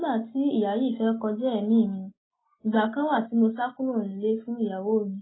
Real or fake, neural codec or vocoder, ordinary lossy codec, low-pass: real; none; AAC, 16 kbps; 7.2 kHz